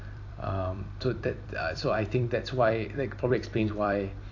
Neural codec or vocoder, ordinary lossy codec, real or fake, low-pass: vocoder, 44.1 kHz, 128 mel bands every 256 samples, BigVGAN v2; AAC, 48 kbps; fake; 7.2 kHz